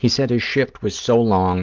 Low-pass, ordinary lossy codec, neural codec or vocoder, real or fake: 7.2 kHz; Opus, 32 kbps; none; real